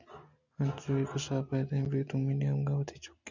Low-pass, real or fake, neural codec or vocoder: 7.2 kHz; real; none